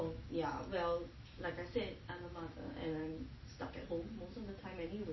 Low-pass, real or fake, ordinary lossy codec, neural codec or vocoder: 7.2 kHz; real; MP3, 24 kbps; none